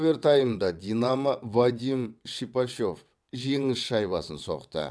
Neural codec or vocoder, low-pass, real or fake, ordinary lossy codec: vocoder, 22.05 kHz, 80 mel bands, WaveNeXt; none; fake; none